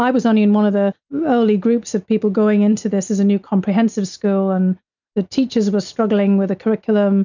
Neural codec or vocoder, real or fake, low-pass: none; real; 7.2 kHz